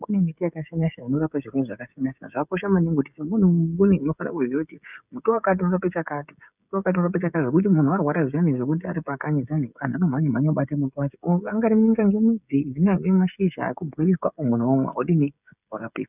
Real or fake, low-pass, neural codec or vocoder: fake; 3.6 kHz; codec, 16 kHz, 16 kbps, FreqCodec, smaller model